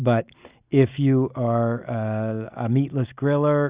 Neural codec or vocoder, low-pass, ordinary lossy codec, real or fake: none; 3.6 kHz; Opus, 64 kbps; real